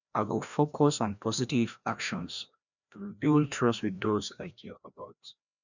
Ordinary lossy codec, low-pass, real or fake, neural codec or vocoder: none; 7.2 kHz; fake; codec, 16 kHz, 1 kbps, FreqCodec, larger model